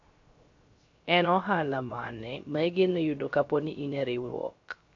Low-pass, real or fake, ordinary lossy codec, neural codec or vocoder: 7.2 kHz; fake; AAC, 48 kbps; codec, 16 kHz, 0.3 kbps, FocalCodec